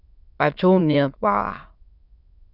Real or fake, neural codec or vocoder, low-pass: fake; autoencoder, 22.05 kHz, a latent of 192 numbers a frame, VITS, trained on many speakers; 5.4 kHz